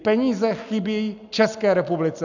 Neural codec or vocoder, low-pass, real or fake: none; 7.2 kHz; real